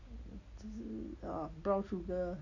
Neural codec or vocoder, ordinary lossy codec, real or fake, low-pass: none; none; real; 7.2 kHz